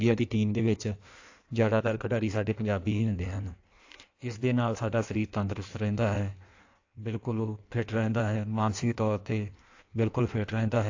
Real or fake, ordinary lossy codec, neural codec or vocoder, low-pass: fake; AAC, 48 kbps; codec, 16 kHz in and 24 kHz out, 1.1 kbps, FireRedTTS-2 codec; 7.2 kHz